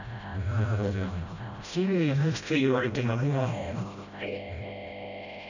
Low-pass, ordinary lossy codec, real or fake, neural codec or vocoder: 7.2 kHz; none; fake; codec, 16 kHz, 0.5 kbps, FreqCodec, smaller model